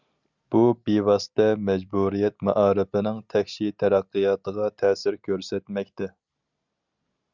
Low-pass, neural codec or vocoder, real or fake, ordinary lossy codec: 7.2 kHz; none; real; Opus, 64 kbps